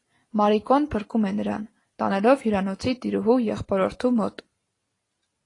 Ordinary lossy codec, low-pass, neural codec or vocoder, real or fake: AAC, 32 kbps; 10.8 kHz; none; real